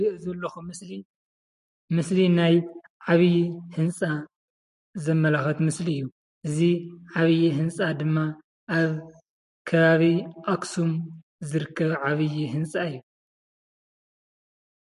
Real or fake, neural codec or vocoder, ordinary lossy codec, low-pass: real; none; MP3, 48 kbps; 14.4 kHz